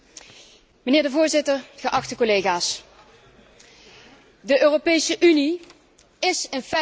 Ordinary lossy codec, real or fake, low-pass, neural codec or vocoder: none; real; none; none